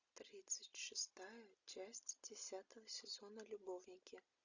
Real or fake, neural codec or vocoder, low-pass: real; none; 7.2 kHz